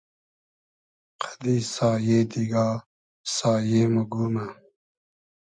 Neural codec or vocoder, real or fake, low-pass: none; real; 9.9 kHz